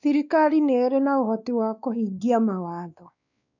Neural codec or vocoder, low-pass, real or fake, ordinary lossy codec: codec, 16 kHz, 2 kbps, X-Codec, WavLM features, trained on Multilingual LibriSpeech; 7.2 kHz; fake; none